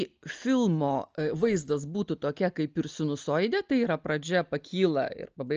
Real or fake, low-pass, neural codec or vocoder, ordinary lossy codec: real; 7.2 kHz; none; Opus, 24 kbps